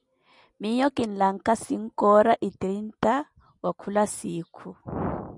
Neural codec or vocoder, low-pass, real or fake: none; 10.8 kHz; real